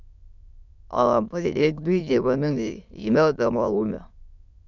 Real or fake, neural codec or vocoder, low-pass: fake; autoencoder, 22.05 kHz, a latent of 192 numbers a frame, VITS, trained on many speakers; 7.2 kHz